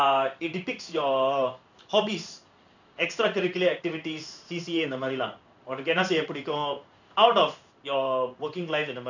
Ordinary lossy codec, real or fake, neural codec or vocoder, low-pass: none; fake; codec, 16 kHz in and 24 kHz out, 1 kbps, XY-Tokenizer; 7.2 kHz